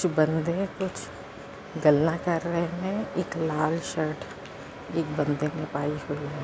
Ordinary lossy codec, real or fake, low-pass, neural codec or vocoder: none; real; none; none